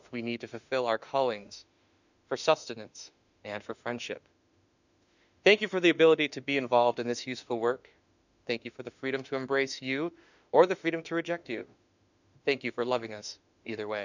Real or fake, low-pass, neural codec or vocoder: fake; 7.2 kHz; autoencoder, 48 kHz, 32 numbers a frame, DAC-VAE, trained on Japanese speech